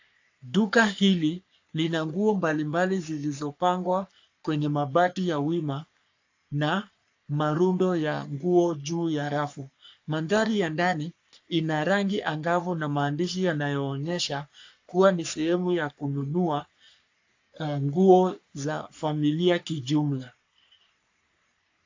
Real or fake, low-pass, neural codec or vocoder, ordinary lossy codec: fake; 7.2 kHz; codec, 44.1 kHz, 3.4 kbps, Pupu-Codec; AAC, 48 kbps